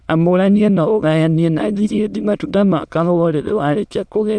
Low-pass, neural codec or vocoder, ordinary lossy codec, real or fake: none; autoencoder, 22.05 kHz, a latent of 192 numbers a frame, VITS, trained on many speakers; none; fake